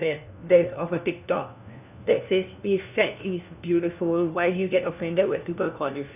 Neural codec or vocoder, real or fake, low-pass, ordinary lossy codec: codec, 16 kHz, 1 kbps, FunCodec, trained on LibriTTS, 50 frames a second; fake; 3.6 kHz; none